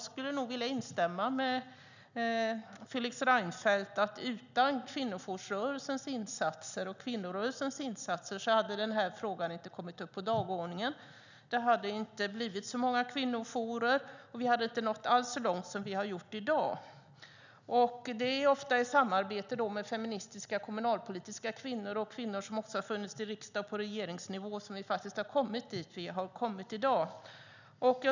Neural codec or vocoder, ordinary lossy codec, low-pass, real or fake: none; none; 7.2 kHz; real